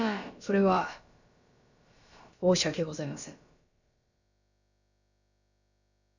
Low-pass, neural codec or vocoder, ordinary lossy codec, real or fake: 7.2 kHz; codec, 16 kHz, about 1 kbps, DyCAST, with the encoder's durations; none; fake